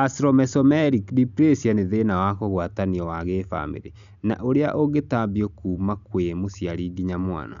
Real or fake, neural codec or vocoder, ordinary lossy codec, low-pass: real; none; none; 7.2 kHz